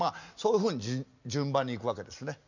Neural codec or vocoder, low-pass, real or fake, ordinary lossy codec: none; 7.2 kHz; real; none